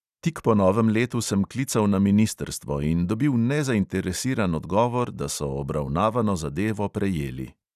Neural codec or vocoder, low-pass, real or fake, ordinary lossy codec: none; 14.4 kHz; real; none